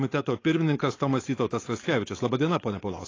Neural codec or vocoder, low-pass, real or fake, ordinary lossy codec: codec, 16 kHz, 4.8 kbps, FACodec; 7.2 kHz; fake; AAC, 32 kbps